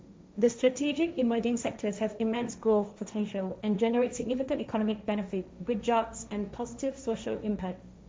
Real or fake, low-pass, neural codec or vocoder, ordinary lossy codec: fake; none; codec, 16 kHz, 1.1 kbps, Voila-Tokenizer; none